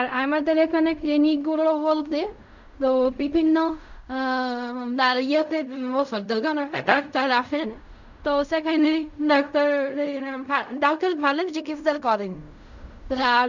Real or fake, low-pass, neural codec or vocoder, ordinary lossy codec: fake; 7.2 kHz; codec, 16 kHz in and 24 kHz out, 0.4 kbps, LongCat-Audio-Codec, fine tuned four codebook decoder; none